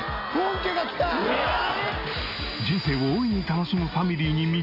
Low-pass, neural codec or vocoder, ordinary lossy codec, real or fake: 5.4 kHz; none; AAC, 32 kbps; real